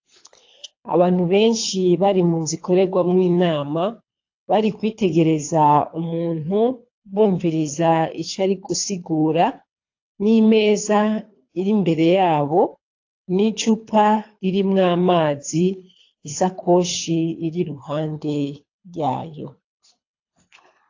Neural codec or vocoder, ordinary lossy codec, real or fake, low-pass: codec, 24 kHz, 3 kbps, HILCodec; AAC, 48 kbps; fake; 7.2 kHz